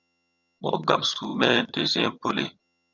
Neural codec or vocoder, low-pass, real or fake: vocoder, 22.05 kHz, 80 mel bands, HiFi-GAN; 7.2 kHz; fake